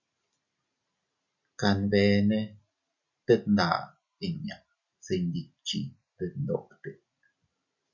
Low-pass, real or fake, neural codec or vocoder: 7.2 kHz; real; none